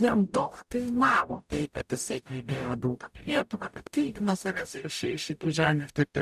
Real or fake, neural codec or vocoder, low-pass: fake; codec, 44.1 kHz, 0.9 kbps, DAC; 14.4 kHz